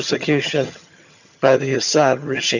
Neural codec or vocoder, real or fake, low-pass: vocoder, 22.05 kHz, 80 mel bands, HiFi-GAN; fake; 7.2 kHz